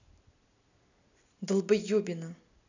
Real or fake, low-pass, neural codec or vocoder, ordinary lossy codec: real; 7.2 kHz; none; none